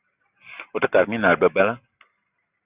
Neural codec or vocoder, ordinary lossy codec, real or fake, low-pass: none; Opus, 64 kbps; real; 3.6 kHz